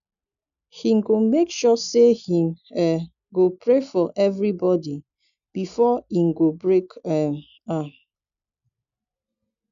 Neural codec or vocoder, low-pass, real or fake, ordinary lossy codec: none; 7.2 kHz; real; none